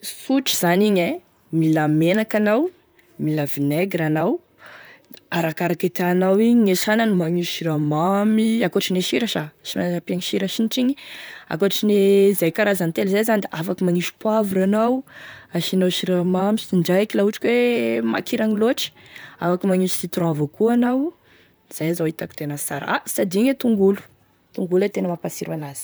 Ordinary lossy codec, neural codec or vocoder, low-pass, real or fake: none; vocoder, 44.1 kHz, 128 mel bands, Pupu-Vocoder; none; fake